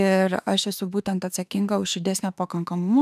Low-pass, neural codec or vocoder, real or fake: 14.4 kHz; autoencoder, 48 kHz, 32 numbers a frame, DAC-VAE, trained on Japanese speech; fake